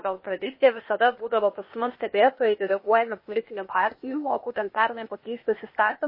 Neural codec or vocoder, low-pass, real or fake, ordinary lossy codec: codec, 16 kHz, 0.8 kbps, ZipCodec; 5.4 kHz; fake; MP3, 24 kbps